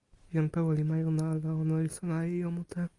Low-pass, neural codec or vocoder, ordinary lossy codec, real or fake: 10.8 kHz; none; Opus, 64 kbps; real